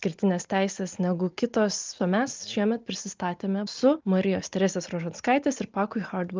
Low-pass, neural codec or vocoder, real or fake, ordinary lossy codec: 7.2 kHz; none; real; Opus, 24 kbps